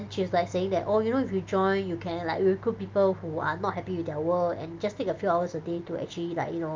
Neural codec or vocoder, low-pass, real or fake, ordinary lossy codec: none; 7.2 kHz; real; Opus, 24 kbps